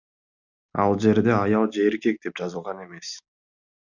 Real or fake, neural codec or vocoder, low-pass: real; none; 7.2 kHz